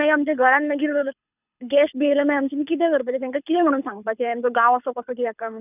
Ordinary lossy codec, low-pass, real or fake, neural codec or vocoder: none; 3.6 kHz; fake; codec, 24 kHz, 6 kbps, HILCodec